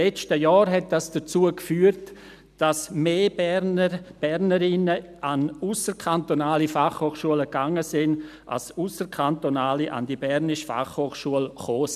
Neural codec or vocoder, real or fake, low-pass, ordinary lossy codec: none; real; 14.4 kHz; none